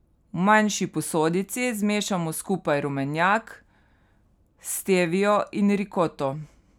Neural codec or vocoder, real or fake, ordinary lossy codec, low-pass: none; real; none; 19.8 kHz